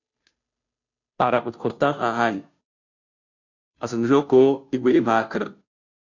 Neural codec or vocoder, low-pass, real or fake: codec, 16 kHz, 0.5 kbps, FunCodec, trained on Chinese and English, 25 frames a second; 7.2 kHz; fake